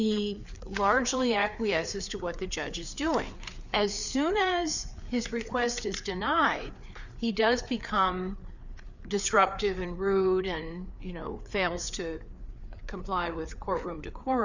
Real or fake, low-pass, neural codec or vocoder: fake; 7.2 kHz; codec, 16 kHz, 4 kbps, FreqCodec, larger model